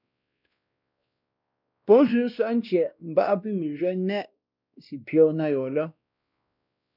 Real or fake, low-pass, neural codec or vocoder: fake; 5.4 kHz; codec, 16 kHz, 1 kbps, X-Codec, WavLM features, trained on Multilingual LibriSpeech